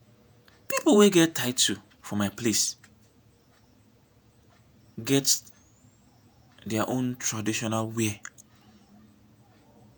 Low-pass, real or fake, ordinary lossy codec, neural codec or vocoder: none; fake; none; vocoder, 48 kHz, 128 mel bands, Vocos